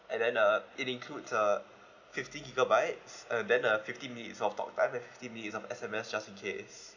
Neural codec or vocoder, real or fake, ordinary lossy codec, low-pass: none; real; none; 7.2 kHz